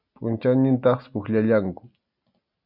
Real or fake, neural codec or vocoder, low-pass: real; none; 5.4 kHz